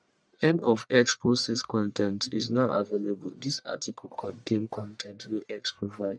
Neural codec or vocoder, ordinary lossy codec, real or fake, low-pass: codec, 44.1 kHz, 1.7 kbps, Pupu-Codec; none; fake; 9.9 kHz